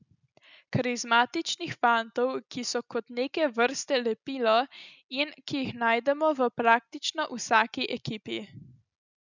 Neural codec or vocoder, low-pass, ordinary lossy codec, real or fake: none; 7.2 kHz; none; real